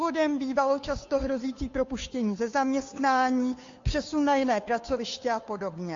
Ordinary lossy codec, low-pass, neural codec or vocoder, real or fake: AAC, 48 kbps; 7.2 kHz; codec, 16 kHz, 2 kbps, FunCodec, trained on Chinese and English, 25 frames a second; fake